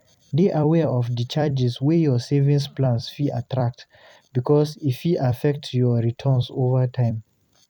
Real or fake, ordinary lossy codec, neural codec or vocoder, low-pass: fake; none; vocoder, 44.1 kHz, 128 mel bands every 256 samples, BigVGAN v2; 19.8 kHz